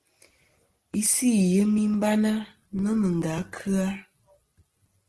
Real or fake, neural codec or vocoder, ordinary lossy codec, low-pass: real; none; Opus, 16 kbps; 10.8 kHz